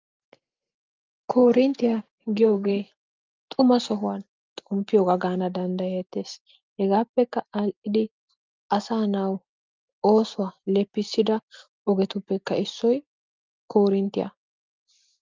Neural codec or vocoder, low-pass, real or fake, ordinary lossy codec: none; 7.2 kHz; real; Opus, 24 kbps